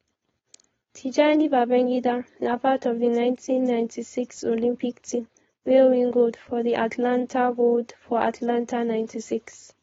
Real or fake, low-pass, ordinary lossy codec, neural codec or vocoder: fake; 7.2 kHz; AAC, 24 kbps; codec, 16 kHz, 4.8 kbps, FACodec